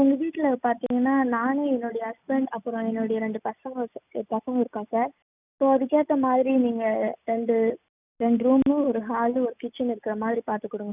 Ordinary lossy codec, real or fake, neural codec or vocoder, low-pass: none; real; none; 3.6 kHz